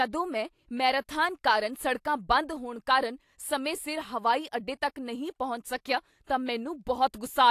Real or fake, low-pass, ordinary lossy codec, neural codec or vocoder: real; 14.4 kHz; AAC, 64 kbps; none